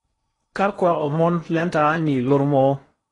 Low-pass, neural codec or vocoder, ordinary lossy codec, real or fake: 10.8 kHz; codec, 16 kHz in and 24 kHz out, 0.8 kbps, FocalCodec, streaming, 65536 codes; AAC, 32 kbps; fake